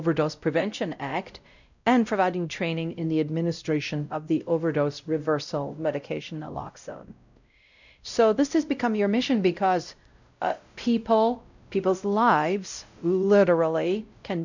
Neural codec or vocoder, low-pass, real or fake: codec, 16 kHz, 0.5 kbps, X-Codec, WavLM features, trained on Multilingual LibriSpeech; 7.2 kHz; fake